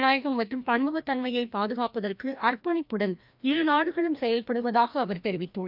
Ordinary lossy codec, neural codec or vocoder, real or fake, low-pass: none; codec, 16 kHz, 1 kbps, FreqCodec, larger model; fake; 5.4 kHz